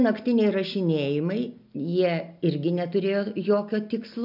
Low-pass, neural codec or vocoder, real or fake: 5.4 kHz; none; real